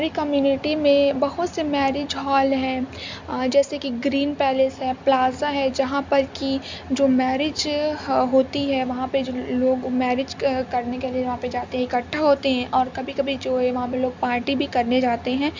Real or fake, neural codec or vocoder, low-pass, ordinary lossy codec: real; none; 7.2 kHz; MP3, 64 kbps